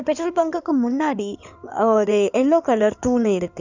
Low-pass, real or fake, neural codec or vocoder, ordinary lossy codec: 7.2 kHz; fake; codec, 16 kHz in and 24 kHz out, 2.2 kbps, FireRedTTS-2 codec; none